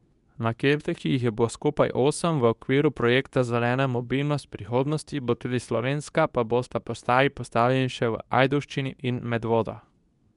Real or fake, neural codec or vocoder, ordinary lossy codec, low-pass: fake; codec, 24 kHz, 0.9 kbps, WavTokenizer, medium speech release version 2; none; 10.8 kHz